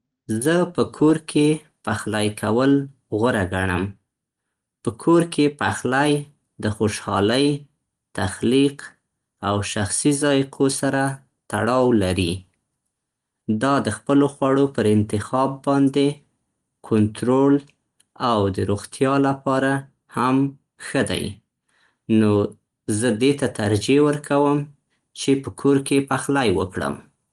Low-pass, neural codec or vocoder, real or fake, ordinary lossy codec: 10.8 kHz; none; real; Opus, 24 kbps